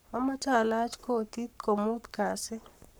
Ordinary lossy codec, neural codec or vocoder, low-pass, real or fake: none; codec, 44.1 kHz, 7.8 kbps, Pupu-Codec; none; fake